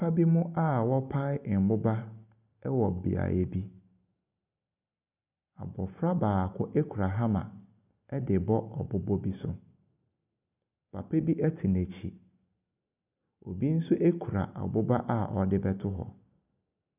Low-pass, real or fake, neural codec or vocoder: 3.6 kHz; real; none